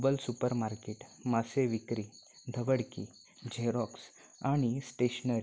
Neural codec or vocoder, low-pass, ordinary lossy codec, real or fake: none; none; none; real